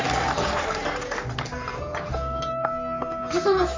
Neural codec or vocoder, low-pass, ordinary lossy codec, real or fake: codec, 44.1 kHz, 3.4 kbps, Pupu-Codec; 7.2 kHz; MP3, 48 kbps; fake